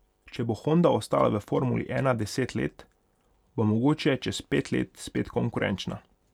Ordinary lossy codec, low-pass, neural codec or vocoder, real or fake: none; 19.8 kHz; none; real